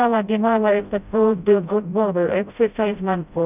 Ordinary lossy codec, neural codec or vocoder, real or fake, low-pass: none; codec, 16 kHz, 0.5 kbps, FreqCodec, smaller model; fake; 3.6 kHz